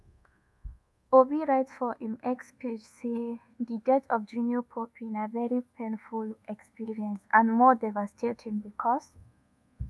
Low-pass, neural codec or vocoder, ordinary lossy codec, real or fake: none; codec, 24 kHz, 1.2 kbps, DualCodec; none; fake